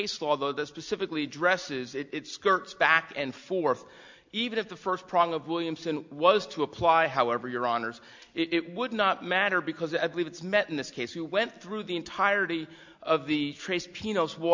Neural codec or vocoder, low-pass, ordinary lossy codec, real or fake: none; 7.2 kHz; MP3, 64 kbps; real